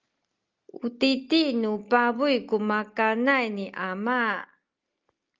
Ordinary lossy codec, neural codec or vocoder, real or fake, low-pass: Opus, 32 kbps; none; real; 7.2 kHz